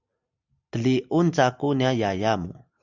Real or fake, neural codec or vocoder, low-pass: real; none; 7.2 kHz